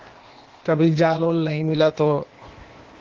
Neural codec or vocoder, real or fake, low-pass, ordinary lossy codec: codec, 16 kHz, 0.8 kbps, ZipCodec; fake; 7.2 kHz; Opus, 16 kbps